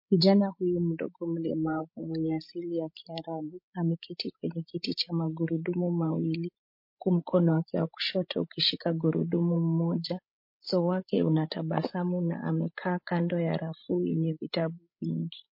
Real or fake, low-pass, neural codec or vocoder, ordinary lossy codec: fake; 5.4 kHz; codec, 16 kHz, 16 kbps, FreqCodec, larger model; MP3, 32 kbps